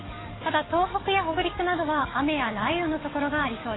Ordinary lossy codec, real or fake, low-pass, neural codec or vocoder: AAC, 16 kbps; fake; 7.2 kHz; codec, 44.1 kHz, 7.8 kbps, DAC